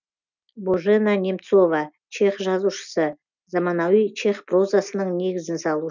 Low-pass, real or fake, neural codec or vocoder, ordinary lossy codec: 7.2 kHz; real; none; none